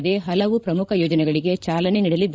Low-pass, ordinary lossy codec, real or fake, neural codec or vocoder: none; none; fake; codec, 16 kHz, 16 kbps, FreqCodec, larger model